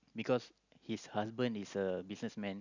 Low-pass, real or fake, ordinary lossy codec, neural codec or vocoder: 7.2 kHz; real; none; none